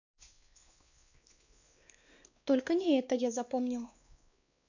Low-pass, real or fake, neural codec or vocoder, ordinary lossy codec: 7.2 kHz; fake; codec, 16 kHz, 2 kbps, X-Codec, WavLM features, trained on Multilingual LibriSpeech; none